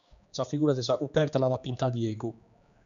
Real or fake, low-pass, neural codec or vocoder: fake; 7.2 kHz; codec, 16 kHz, 2 kbps, X-Codec, HuBERT features, trained on general audio